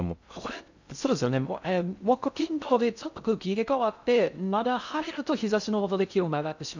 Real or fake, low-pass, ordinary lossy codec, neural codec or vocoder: fake; 7.2 kHz; none; codec, 16 kHz in and 24 kHz out, 0.6 kbps, FocalCodec, streaming, 2048 codes